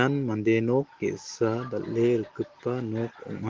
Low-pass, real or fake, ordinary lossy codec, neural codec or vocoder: 7.2 kHz; real; Opus, 16 kbps; none